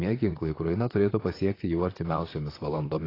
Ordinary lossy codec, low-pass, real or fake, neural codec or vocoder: AAC, 24 kbps; 5.4 kHz; fake; vocoder, 44.1 kHz, 128 mel bands, Pupu-Vocoder